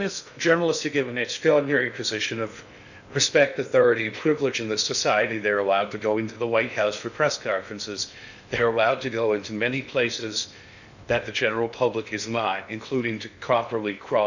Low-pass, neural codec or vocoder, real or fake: 7.2 kHz; codec, 16 kHz in and 24 kHz out, 0.6 kbps, FocalCodec, streaming, 2048 codes; fake